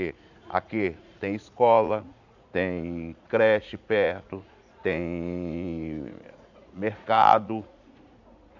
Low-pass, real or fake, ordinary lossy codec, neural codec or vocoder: 7.2 kHz; fake; none; vocoder, 44.1 kHz, 80 mel bands, Vocos